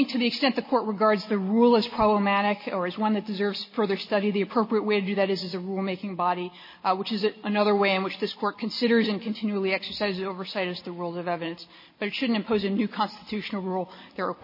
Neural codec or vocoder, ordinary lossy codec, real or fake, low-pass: none; MP3, 24 kbps; real; 5.4 kHz